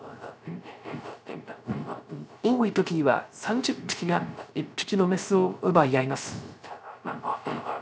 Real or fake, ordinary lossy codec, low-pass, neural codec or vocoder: fake; none; none; codec, 16 kHz, 0.3 kbps, FocalCodec